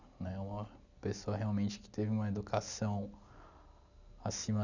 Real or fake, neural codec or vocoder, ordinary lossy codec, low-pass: real; none; none; 7.2 kHz